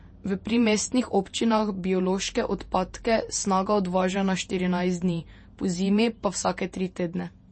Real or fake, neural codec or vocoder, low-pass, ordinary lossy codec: fake; vocoder, 48 kHz, 128 mel bands, Vocos; 9.9 kHz; MP3, 32 kbps